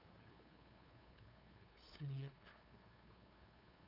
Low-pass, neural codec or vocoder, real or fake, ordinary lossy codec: 5.4 kHz; codec, 16 kHz, 2 kbps, FunCodec, trained on LibriTTS, 25 frames a second; fake; none